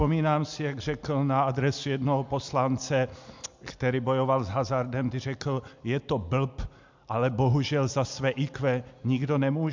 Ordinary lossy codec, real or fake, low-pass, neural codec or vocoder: MP3, 64 kbps; real; 7.2 kHz; none